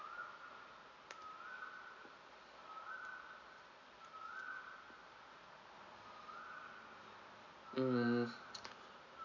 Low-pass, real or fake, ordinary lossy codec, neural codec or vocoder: 7.2 kHz; real; none; none